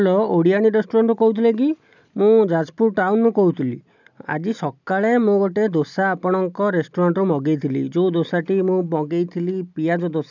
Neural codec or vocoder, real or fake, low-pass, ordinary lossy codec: none; real; 7.2 kHz; none